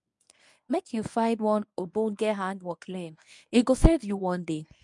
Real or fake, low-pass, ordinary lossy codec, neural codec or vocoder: fake; 10.8 kHz; none; codec, 24 kHz, 0.9 kbps, WavTokenizer, medium speech release version 1